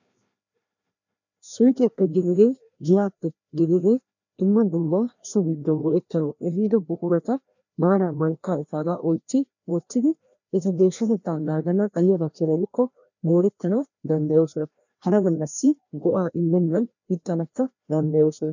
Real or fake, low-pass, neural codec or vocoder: fake; 7.2 kHz; codec, 16 kHz, 1 kbps, FreqCodec, larger model